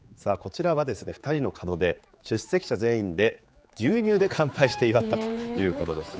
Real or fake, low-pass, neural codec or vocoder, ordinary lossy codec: fake; none; codec, 16 kHz, 4 kbps, X-Codec, HuBERT features, trained on general audio; none